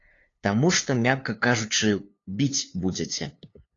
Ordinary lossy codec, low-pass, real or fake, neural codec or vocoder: AAC, 32 kbps; 7.2 kHz; fake; codec, 16 kHz, 2 kbps, FunCodec, trained on LibriTTS, 25 frames a second